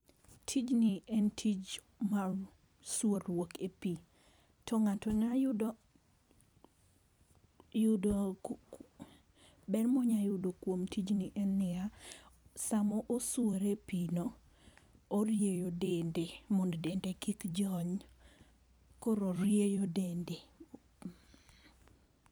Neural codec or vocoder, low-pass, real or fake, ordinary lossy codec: vocoder, 44.1 kHz, 128 mel bands every 256 samples, BigVGAN v2; none; fake; none